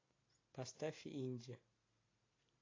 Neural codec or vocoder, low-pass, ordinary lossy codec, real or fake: none; 7.2 kHz; AAC, 32 kbps; real